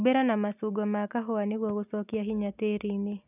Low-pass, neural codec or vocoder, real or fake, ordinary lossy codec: 3.6 kHz; none; real; none